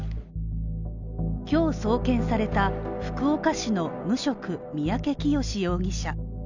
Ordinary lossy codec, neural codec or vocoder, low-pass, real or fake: none; none; 7.2 kHz; real